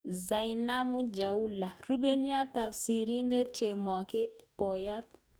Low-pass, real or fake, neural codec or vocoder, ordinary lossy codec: none; fake; codec, 44.1 kHz, 2.6 kbps, DAC; none